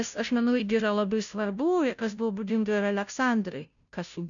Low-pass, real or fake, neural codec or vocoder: 7.2 kHz; fake; codec, 16 kHz, 0.5 kbps, FunCodec, trained on Chinese and English, 25 frames a second